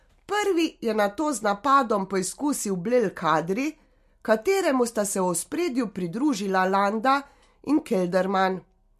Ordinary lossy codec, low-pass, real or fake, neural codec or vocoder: MP3, 64 kbps; 14.4 kHz; real; none